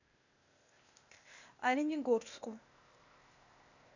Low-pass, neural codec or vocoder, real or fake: 7.2 kHz; codec, 16 kHz, 0.8 kbps, ZipCodec; fake